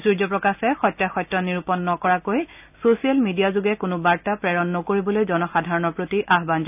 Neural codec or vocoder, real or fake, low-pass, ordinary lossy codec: none; real; 3.6 kHz; none